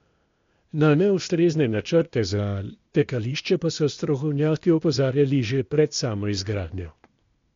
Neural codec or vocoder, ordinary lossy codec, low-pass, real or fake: codec, 16 kHz, 0.8 kbps, ZipCodec; MP3, 48 kbps; 7.2 kHz; fake